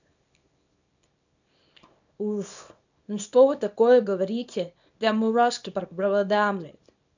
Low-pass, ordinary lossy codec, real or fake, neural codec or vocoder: 7.2 kHz; none; fake; codec, 24 kHz, 0.9 kbps, WavTokenizer, small release